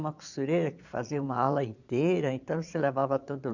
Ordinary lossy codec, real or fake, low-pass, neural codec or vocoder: none; fake; 7.2 kHz; vocoder, 22.05 kHz, 80 mel bands, Vocos